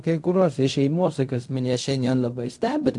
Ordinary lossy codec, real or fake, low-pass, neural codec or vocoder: AAC, 64 kbps; fake; 10.8 kHz; codec, 16 kHz in and 24 kHz out, 0.4 kbps, LongCat-Audio-Codec, fine tuned four codebook decoder